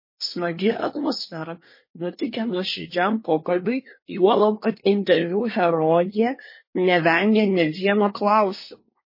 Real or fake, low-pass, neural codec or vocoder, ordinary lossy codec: fake; 5.4 kHz; codec, 24 kHz, 1 kbps, SNAC; MP3, 24 kbps